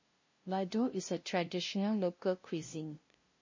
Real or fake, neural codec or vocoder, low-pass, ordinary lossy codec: fake; codec, 16 kHz, 0.5 kbps, FunCodec, trained on LibriTTS, 25 frames a second; 7.2 kHz; MP3, 32 kbps